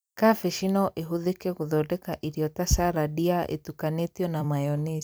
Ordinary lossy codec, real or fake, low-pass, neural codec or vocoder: none; fake; none; vocoder, 44.1 kHz, 128 mel bands every 256 samples, BigVGAN v2